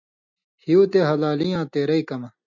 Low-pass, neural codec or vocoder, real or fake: 7.2 kHz; none; real